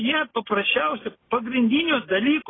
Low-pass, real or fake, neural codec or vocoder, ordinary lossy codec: 7.2 kHz; real; none; AAC, 16 kbps